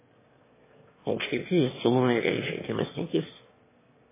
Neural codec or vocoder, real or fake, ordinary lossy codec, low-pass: autoencoder, 22.05 kHz, a latent of 192 numbers a frame, VITS, trained on one speaker; fake; MP3, 16 kbps; 3.6 kHz